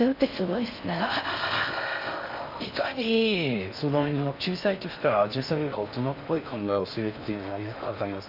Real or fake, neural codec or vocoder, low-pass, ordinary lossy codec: fake; codec, 16 kHz in and 24 kHz out, 0.6 kbps, FocalCodec, streaming, 4096 codes; 5.4 kHz; none